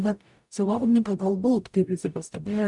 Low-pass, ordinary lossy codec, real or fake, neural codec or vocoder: 10.8 kHz; MP3, 96 kbps; fake; codec, 44.1 kHz, 0.9 kbps, DAC